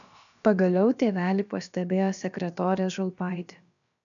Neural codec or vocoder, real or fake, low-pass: codec, 16 kHz, about 1 kbps, DyCAST, with the encoder's durations; fake; 7.2 kHz